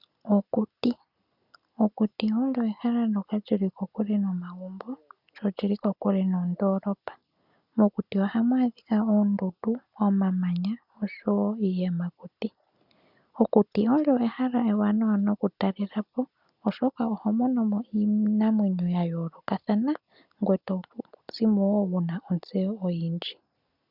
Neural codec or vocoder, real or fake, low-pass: none; real; 5.4 kHz